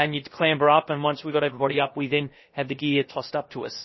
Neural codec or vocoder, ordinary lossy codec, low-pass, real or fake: codec, 16 kHz, about 1 kbps, DyCAST, with the encoder's durations; MP3, 24 kbps; 7.2 kHz; fake